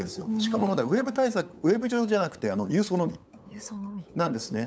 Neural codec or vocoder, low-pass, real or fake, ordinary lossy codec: codec, 16 kHz, 8 kbps, FunCodec, trained on LibriTTS, 25 frames a second; none; fake; none